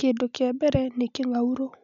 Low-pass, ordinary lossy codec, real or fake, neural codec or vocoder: 7.2 kHz; none; real; none